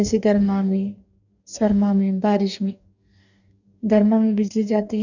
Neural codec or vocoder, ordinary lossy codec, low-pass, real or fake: codec, 44.1 kHz, 2.6 kbps, DAC; none; 7.2 kHz; fake